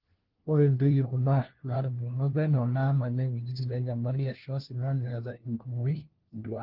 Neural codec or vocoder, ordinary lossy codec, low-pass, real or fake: codec, 16 kHz, 1 kbps, FunCodec, trained on LibriTTS, 50 frames a second; Opus, 16 kbps; 5.4 kHz; fake